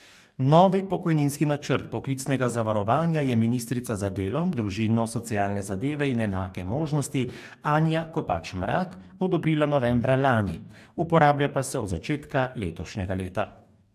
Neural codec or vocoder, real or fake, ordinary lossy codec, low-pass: codec, 44.1 kHz, 2.6 kbps, DAC; fake; AAC, 96 kbps; 14.4 kHz